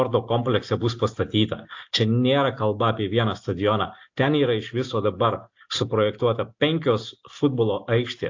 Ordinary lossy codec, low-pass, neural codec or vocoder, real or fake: AAC, 48 kbps; 7.2 kHz; none; real